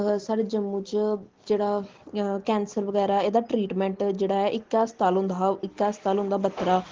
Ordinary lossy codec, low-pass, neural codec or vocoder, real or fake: Opus, 16 kbps; 7.2 kHz; none; real